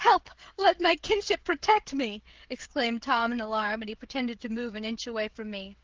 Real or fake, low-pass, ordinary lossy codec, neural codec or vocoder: fake; 7.2 kHz; Opus, 16 kbps; codec, 16 kHz, 16 kbps, FreqCodec, smaller model